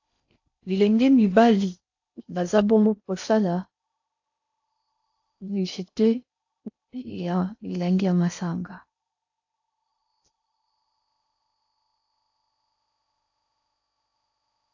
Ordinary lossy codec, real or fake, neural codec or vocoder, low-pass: AAC, 48 kbps; fake; codec, 16 kHz in and 24 kHz out, 0.6 kbps, FocalCodec, streaming, 4096 codes; 7.2 kHz